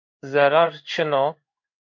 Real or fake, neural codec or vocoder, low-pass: fake; codec, 16 kHz in and 24 kHz out, 1 kbps, XY-Tokenizer; 7.2 kHz